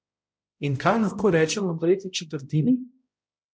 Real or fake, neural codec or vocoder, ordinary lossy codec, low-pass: fake; codec, 16 kHz, 0.5 kbps, X-Codec, HuBERT features, trained on balanced general audio; none; none